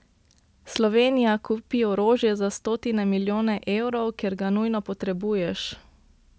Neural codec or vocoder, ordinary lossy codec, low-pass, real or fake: none; none; none; real